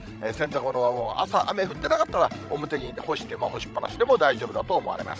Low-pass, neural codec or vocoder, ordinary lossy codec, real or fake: none; codec, 16 kHz, 16 kbps, FreqCodec, larger model; none; fake